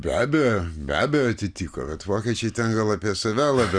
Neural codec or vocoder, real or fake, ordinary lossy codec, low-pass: none; real; Opus, 64 kbps; 9.9 kHz